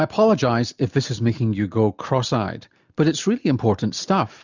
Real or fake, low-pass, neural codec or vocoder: real; 7.2 kHz; none